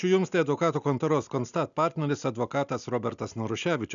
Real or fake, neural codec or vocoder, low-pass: real; none; 7.2 kHz